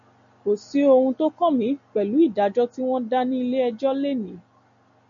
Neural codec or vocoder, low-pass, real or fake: none; 7.2 kHz; real